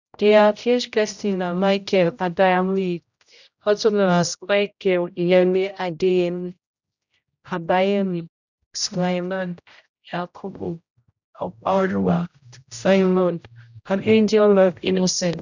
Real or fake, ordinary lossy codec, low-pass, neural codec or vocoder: fake; none; 7.2 kHz; codec, 16 kHz, 0.5 kbps, X-Codec, HuBERT features, trained on general audio